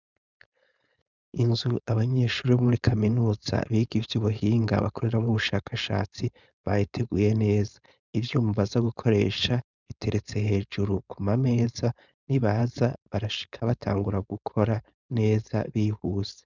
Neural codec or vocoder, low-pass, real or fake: codec, 16 kHz, 4.8 kbps, FACodec; 7.2 kHz; fake